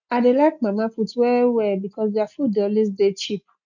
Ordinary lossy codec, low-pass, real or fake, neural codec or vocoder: MP3, 48 kbps; 7.2 kHz; real; none